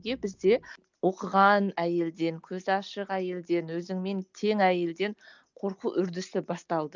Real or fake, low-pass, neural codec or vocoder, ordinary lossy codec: real; 7.2 kHz; none; none